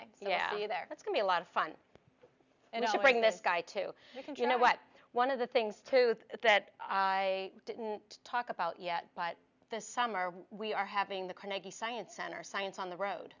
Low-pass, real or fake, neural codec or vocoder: 7.2 kHz; real; none